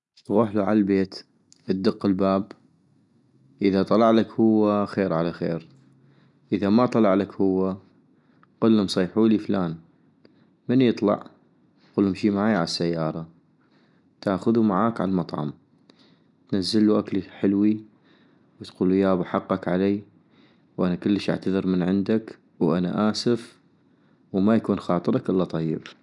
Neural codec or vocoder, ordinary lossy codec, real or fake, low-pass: none; none; real; 10.8 kHz